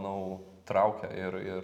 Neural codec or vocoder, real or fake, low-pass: none; real; 19.8 kHz